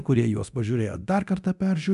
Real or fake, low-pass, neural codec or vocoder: fake; 10.8 kHz; codec, 24 kHz, 0.9 kbps, DualCodec